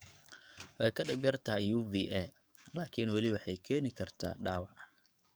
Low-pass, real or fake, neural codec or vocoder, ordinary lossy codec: none; fake; codec, 44.1 kHz, 7.8 kbps, DAC; none